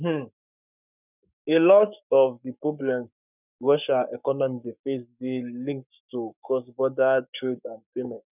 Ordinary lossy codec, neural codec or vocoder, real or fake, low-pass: none; codec, 44.1 kHz, 7.8 kbps, Pupu-Codec; fake; 3.6 kHz